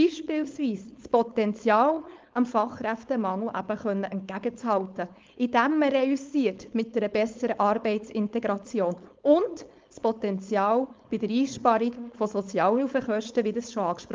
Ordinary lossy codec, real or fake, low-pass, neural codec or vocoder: Opus, 24 kbps; fake; 7.2 kHz; codec, 16 kHz, 4.8 kbps, FACodec